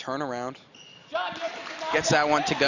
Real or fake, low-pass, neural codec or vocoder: real; 7.2 kHz; none